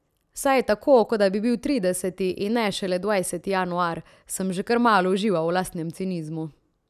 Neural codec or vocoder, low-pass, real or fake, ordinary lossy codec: none; 14.4 kHz; real; none